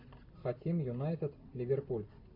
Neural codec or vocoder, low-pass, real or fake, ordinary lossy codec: none; 5.4 kHz; real; AAC, 32 kbps